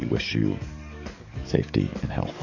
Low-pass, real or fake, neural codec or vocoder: 7.2 kHz; fake; codec, 44.1 kHz, 7.8 kbps, DAC